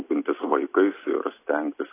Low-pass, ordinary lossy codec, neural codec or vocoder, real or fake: 3.6 kHz; AAC, 24 kbps; none; real